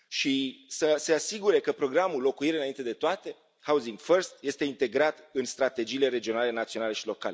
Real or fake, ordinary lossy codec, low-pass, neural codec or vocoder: real; none; none; none